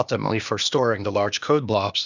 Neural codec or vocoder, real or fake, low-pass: codec, 16 kHz, 0.8 kbps, ZipCodec; fake; 7.2 kHz